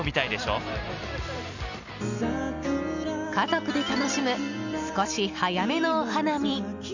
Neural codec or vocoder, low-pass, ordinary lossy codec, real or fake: none; 7.2 kHz; none; real